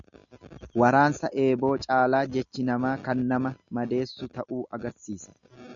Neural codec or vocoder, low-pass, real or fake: none; 7.2 kHz; real